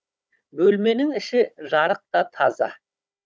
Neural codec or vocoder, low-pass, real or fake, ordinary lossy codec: codec, 16 kHz, 16 kbps, FunCodec, trained on Chinese and English, 50 frames a second; none; fake; none